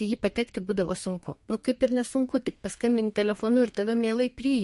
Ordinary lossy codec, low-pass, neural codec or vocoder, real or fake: MP3, 48 kbps; 14.4 kHz; codec, 32 kHz, 1.9 kbps, SNAC; fake